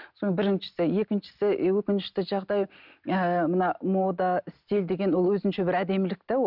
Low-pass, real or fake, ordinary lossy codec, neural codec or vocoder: 5.4 kHz; real; none; none